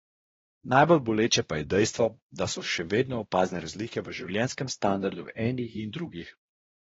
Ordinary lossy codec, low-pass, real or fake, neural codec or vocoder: AAC, 24 kbps; 7.2 kHz; fake; codec, 16 kHz, 1 kbps, X-Codec, WavLM features, trained on Multilingual LibriSpeech